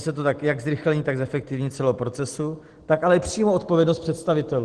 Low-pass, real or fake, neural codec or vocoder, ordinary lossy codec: 9.9 kHz; real; none; Opus, 16 kbps